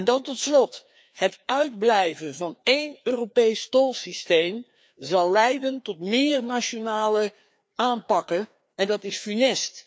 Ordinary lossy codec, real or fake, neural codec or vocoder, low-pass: none; fake; codec, 16 kHz, 2 kbps, FreqCodec, larger model; none